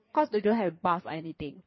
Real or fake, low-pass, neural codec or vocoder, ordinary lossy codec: fake; 7.2 kHz; codec, 16 kHz in and 24 kHz out, 2.2 kbps, FireRedTTS-2 codec; MP3, 24 kbps